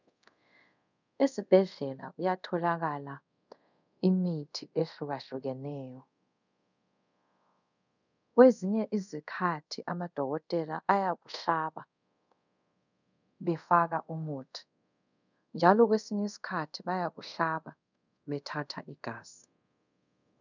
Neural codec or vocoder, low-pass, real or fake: codec, 24 kHz, 0.5 kbps, DualCodec; 7.2 kHz; fake